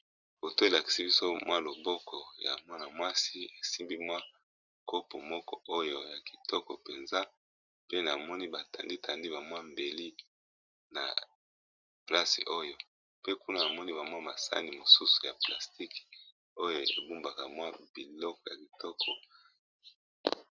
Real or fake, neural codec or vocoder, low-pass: real; none; 7.2 kHz